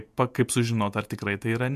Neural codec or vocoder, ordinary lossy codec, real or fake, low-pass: vocoder, 48 kHz, 128 mel bands, Vocos; MP3, 96 kbps; fake; 14.4 kHz